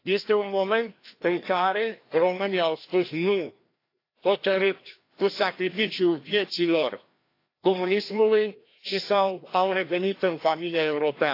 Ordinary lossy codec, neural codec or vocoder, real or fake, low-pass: AAC, 32 kbps; codec, 16 kHz, 1 kbps, FreqCodec, larger model; fake; 5.4 kHz